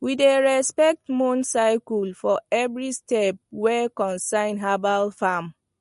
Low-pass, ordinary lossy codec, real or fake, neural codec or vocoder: 14.4 kHz; MP3, 48 kbps; real; none